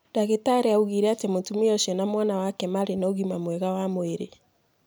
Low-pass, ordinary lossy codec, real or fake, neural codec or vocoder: none; none; real; none